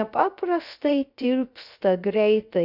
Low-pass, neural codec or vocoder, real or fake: 5.4 kHz; codec, 16 kHz, 0.3 kbps, FocalCodec; fake